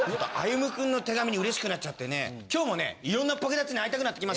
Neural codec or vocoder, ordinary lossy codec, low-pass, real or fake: none; none; none; real